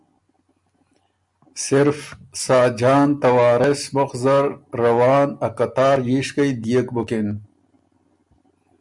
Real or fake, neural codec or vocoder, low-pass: real; none; 10.8 kHz